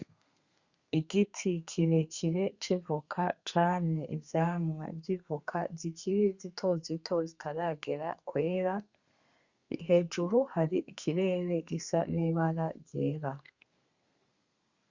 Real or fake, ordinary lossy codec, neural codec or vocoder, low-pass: fake; Opus, 64 kbps; codec, 32 kHz, 1.9 kbps, SNAC; 7.2 kHz